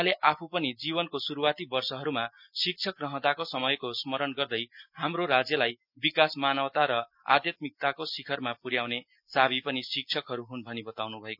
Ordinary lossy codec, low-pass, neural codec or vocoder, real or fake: none; 5.4 kHz; none; real